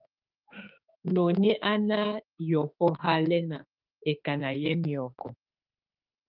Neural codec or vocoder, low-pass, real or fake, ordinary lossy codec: autoencoder, 48 kHz, 32 numbers a frame, DAC-VAE, trained on Japanese speech; 5.4 kHz; fake; Opus, 32 kbps